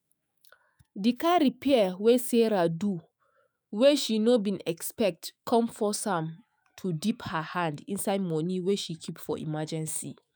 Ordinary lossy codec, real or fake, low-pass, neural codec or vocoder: none; fake; none; autoencoder, 48 kHz, 128 numbers a frame, DAC-VAE, trained on Japanese speech